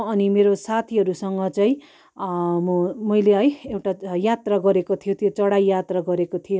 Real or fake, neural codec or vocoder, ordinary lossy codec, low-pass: real; none; none; none